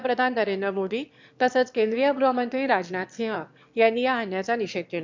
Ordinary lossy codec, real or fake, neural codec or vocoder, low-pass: MP3, 64 kbps; fake; autoencoder, 22.05 kHz, a latent of 192 numbers a frame, VITS, trained on one speaker; 7.2 kHz